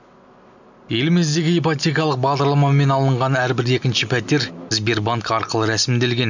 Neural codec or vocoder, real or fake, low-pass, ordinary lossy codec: none; real; 7.2 kHz; none